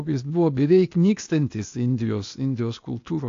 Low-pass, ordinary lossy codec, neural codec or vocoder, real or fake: 7.2 kHz; AAC, 48 kbps; codec, 16 kHz, 0.8 kbps, ZipCodec; fake